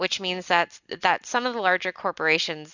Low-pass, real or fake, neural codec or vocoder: 7.2 kHz; real; none